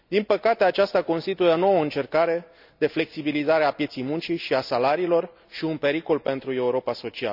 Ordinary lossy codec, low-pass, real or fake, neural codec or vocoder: none; 5.4 kHz; real; none